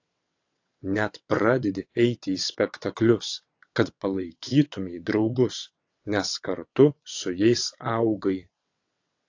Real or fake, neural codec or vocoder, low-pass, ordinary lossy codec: fake; vocoder, 22.05 kHz, 80 mel bands, WaveNeXt; 7.2 kHz; AAC, 48 kbps